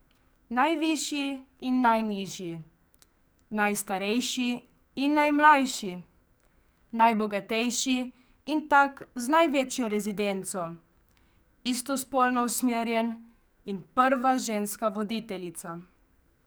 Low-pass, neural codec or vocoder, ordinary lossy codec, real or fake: none; codec, 44.1 kHz, 2.6 kbps, SNAC; none; fake